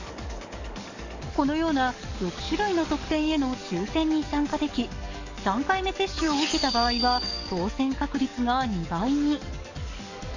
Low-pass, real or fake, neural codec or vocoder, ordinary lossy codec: 7.2 kHz; fake; codec, 44.1 kHz, 7.8 kbps, DAC; none